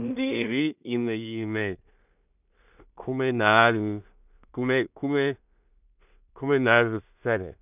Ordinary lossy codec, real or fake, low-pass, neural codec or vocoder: none; fake; 3.6 kHz; codec, 16 kHz in and 24 kHz out, 0.4 kbps, LongCat-Audio-Codec, two codebook decoder